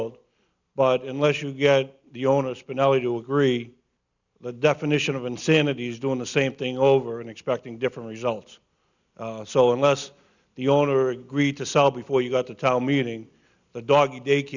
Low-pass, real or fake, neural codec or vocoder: 7.2 kHz; real; none